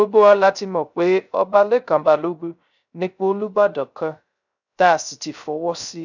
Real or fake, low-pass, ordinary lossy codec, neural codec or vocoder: fake; 7.2 kHz; none; codec, 16 kHz, 0.3 kbps, FocalCodec